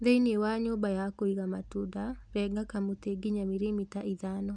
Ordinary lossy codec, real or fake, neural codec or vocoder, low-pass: none; real; none; 9.9 kHz